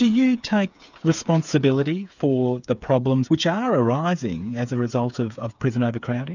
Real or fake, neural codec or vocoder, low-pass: fake; codec, 16 kHz, 8 kbps, FreqCodec, smaller model; 7.2 kHz